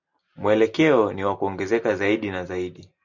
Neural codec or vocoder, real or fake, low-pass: none; real; 7.2 kHz